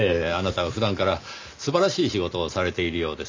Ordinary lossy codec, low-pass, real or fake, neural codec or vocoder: MP3, 64 kbps; 7.2 kHz; real; none